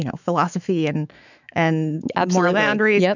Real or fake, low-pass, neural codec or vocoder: fake; 7.2 kHz; autoencoder, 48 kHz, 128 numbers a frame, DAC-VAE, trained on Japanese speech